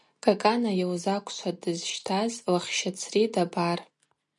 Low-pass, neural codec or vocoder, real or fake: 10.8 kHz; none; real